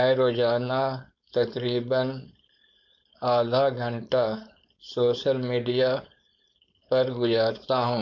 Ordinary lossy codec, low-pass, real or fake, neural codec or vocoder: MP3, 48 kbps; 7.2 kHz; fake; codec, 16 kHz, 4.8 kbps, FACodec